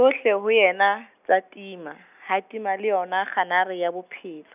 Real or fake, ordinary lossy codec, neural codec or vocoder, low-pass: real; none; none; 3.6 kHz